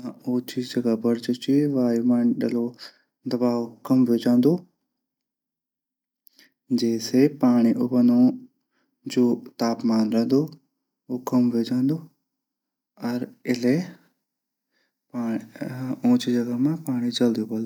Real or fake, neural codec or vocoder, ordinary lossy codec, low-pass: real; none; none; 19.8 kHz